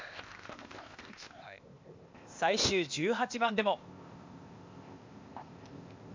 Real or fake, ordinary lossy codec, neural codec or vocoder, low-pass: fake; MP3, 64 kbps; codec, 16 kHz, 0.8 kbps, ZipCodec; 7.2 kHz